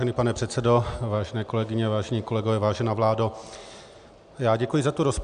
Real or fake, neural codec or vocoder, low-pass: real; none; 9.9 kHz